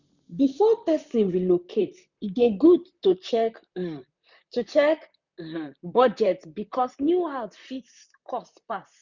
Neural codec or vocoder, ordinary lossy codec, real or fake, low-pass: vocoder, 44.1 kHz, 128 mel bands, Pupu-Vocoder; none; fake; 7.2 kHz